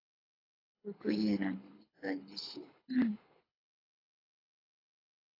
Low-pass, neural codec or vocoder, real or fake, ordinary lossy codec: 5.4 kHz; codec, 24 kHz, 6 kbps, HILCodec; fake; AAC, 24 kbps